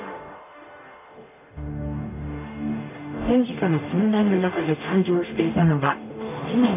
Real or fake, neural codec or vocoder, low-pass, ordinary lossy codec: fake; codec, 44.1 kHz, 0.9 kbps, DAC; 3.6 kHz; none